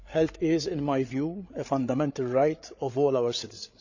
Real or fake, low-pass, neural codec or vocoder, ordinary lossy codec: fake; 7.2 kHz; codec, 16 kHz, 8 kbps, FreqCodec, larger model; none